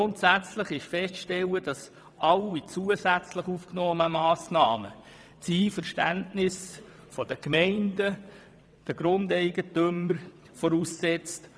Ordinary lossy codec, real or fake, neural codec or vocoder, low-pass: none; fake; vocoder, 22.05 kHz, 80 mel bands, WaveNeXt; none